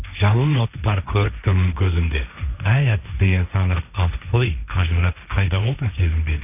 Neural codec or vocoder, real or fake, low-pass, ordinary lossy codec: codec, 16 kHz, 1.1 kbps, Voila-Tokenizer; fake; 3.6 kHz; none